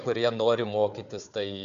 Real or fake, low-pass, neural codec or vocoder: fake; 7.2 kHz; codec, 16 kHz, 4 kbps, FunCodec, trained on Chinese and English, 50 frames a second